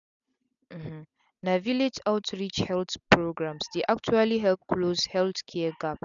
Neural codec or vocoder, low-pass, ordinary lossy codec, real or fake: none; 7.2 kHz; none; real